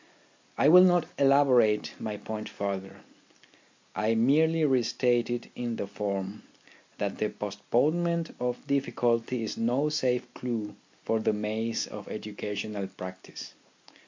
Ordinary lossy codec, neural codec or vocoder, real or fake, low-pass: MP3, 48 kbps; none; real; 7.2 kHz